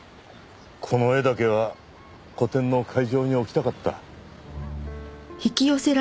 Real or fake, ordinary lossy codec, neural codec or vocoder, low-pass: real; none; none; none